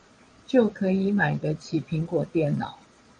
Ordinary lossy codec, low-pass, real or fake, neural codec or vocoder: MP3, 64 kbps; 9.9 kHz; fake; vocoder, 22.05 kHz, 80 mel bands, Vocos